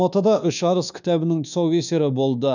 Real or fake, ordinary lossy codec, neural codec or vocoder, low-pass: fake; none; codec, 24 kHz, 1.2 kbps, DualCodec; 7.2 kHz